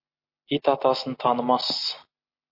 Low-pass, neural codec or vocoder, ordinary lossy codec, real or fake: 5.4 kHz; none; MP3, 32 kbps; real